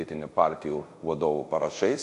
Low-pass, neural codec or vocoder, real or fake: 10.8 kHz; codec, 24 kHz, 0.5 kbps, DualCodec; fake